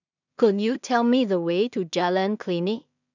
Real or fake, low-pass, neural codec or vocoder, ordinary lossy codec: fake; 7.2 kHz; codec, 16 kHz in and 24 kHz out, 0.4 kbps, LongCat-Audio-Codec, two codebook decoder; none